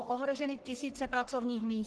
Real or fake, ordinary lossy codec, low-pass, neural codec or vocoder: fake; Opus, 16 kbps; 10.8 kHz; codec, 44.1 kHz, 1.7 kbps, Pupu-Codec